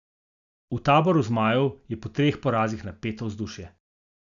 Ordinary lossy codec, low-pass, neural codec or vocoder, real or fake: none; 7.2 kHz; none; real